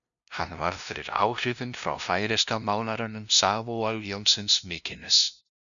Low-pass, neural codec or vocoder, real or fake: 7.2 kHz; codec, 16 kHz, 0.5 kbps, FunCodec, trained on LibriTTS, 25 frames a second; fake